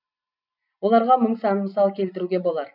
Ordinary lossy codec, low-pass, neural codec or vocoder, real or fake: none; 5.4 kHz; none; real